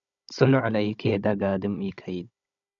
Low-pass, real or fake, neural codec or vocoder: 7.2 kHz; fake; codec, 16 kHz, 16 kbps, FunCodec, trained on Chinese and English, 50 frames a second